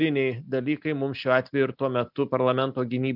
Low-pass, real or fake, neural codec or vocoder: 5.4 kHz; real; none